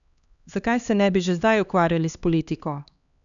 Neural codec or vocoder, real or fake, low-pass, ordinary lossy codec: codec, 16 kHz, 2 kbps, X-Codec, HuBERT features, trained on LibriSpeech; fake; 7.2 kHz; none